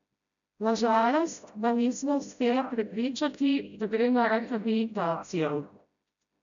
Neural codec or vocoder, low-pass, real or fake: codec, 16 kHz, 0.5 kbps, FreqCodec, smaller model; 7.2 kHz; fake